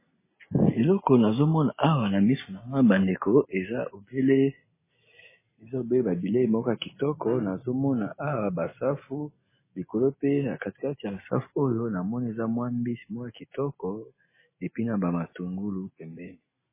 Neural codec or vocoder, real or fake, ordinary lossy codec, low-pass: none; real; MP3, 16 kbps; 3.6 kHz